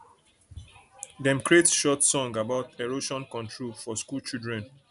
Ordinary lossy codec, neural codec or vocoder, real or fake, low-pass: none; none; real; 10.8 kHz